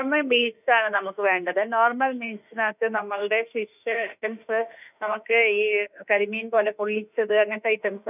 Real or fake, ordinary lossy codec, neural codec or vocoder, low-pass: fake; none; autoencoder, 48 kHz, 32 numbers a frame, DAC-VAE, trained on Japanese speech; 3.6 kHz